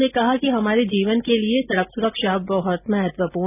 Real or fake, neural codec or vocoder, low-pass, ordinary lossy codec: real; none; 3.6 kHz; none